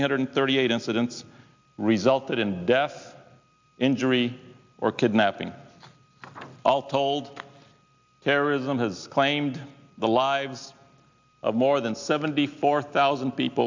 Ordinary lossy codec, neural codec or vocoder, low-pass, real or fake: MP3, 64 kbps; none; 7.2 kHz; real